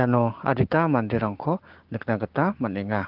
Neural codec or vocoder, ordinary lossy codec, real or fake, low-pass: codec, 16 kHz, 6 kbps, DAC; Opus, 16 kbps; fake; 5.4 kHz